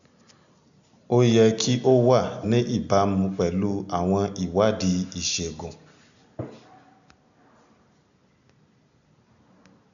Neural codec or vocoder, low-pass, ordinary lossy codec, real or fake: none; 7.2 kHz; none; real